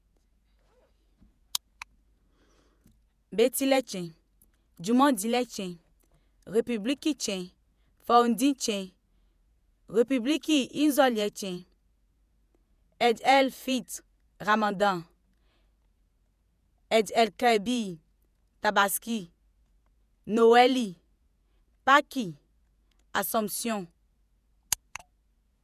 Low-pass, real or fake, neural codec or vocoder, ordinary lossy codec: 14.4 kHz; fake; vocoder, 48 kHz, 128 mel bands, Vocos; none